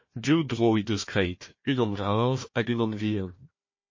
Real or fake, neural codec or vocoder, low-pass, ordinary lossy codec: fake; codec, 16 kHz, 1 kbps, FunCodec, trained on Chinese and English, 50 frames a second; 7.2 kHz; MP3, 32 kbps